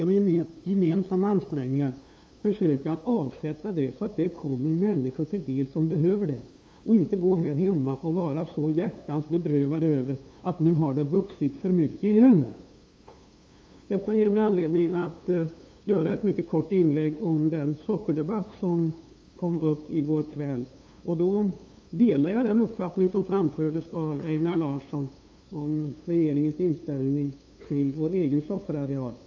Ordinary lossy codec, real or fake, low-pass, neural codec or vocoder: none; fake; none; codec, 16 kHz, 2 kbps, FunCodec, trained on LibriTTS, 25 frames a second